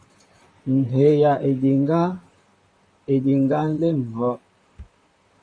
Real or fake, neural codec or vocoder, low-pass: fake; vocoder, 44.1 kHz, 128 mel bands, Pupu-Vocoder; 9.9 kHz